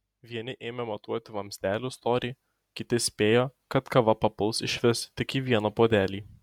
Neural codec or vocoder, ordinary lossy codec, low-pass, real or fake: none; MP3, 96 kbps; 19.8 kHz; real